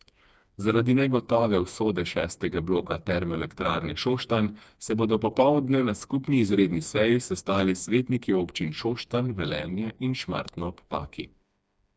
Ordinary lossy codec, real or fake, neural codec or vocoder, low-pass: none; fake; codec, 16 kHz, 2 kbps, FreqCodec, smaller model; none